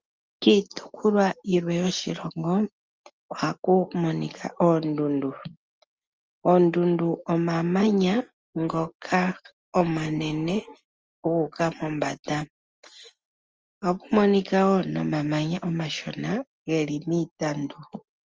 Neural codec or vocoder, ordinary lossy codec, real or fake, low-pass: none; Opus, 24 kbps; real; 7.2 kHz